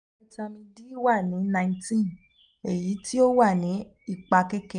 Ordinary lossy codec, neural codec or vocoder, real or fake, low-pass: none; none; real; 9.9 kHz